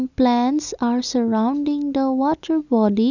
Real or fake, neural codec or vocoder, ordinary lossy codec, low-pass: real; none; none; 7.2 kHz